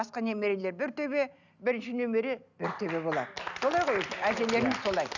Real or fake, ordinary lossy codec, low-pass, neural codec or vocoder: real; none; 7.2 kHz; none